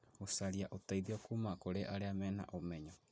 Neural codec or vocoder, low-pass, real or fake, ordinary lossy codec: none; none; real; none